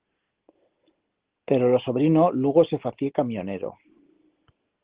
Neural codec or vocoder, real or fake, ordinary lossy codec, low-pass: none; real; Opus, 16 kbps; 3.6 kHz